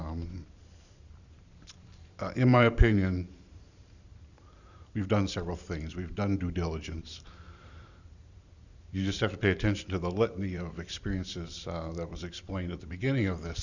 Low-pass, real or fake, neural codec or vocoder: 7.2 kHz; fake; vocoder, 44.1 kHz, 128 mel bands every 512 samples, BigVGAN v2